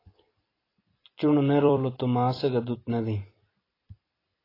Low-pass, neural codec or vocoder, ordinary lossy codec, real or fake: 5.4 kHz; vocoder, 44.1 kHz, 128 mel bands every 256 samples, BigVGAN v2; AAC, 24 kbps; fake